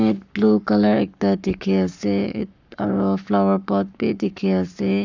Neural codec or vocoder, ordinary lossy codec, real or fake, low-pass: codec, 44.1 kHz, 7.8 kbps, Pupu-Codec; none; fake; 7.2 kHz